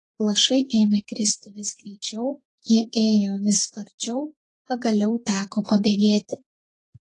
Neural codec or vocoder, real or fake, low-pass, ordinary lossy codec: codec, 32 kHz, 1.9 kbps, SNAC; fake; 10.8 kHz; AAC, 32 kbps